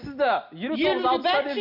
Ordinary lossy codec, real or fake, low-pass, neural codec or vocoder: none; real; 5.4 kHz; none